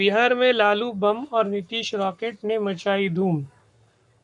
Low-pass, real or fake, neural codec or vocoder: 10.8 kHz; fake; codec, 44.1 kHz, 7.8 kbps, Pupu-Codec